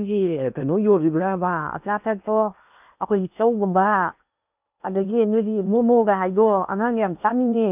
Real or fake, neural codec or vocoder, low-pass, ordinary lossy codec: fake; codec, 16 kHz in and 24 kHz out, 0.8 kbps, FocalCodec, streaming, 65536 codes; 3.6 kHz; none